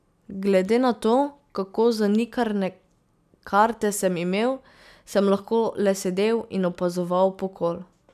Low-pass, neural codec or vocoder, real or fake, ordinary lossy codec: 14.4 kHz; none; real; none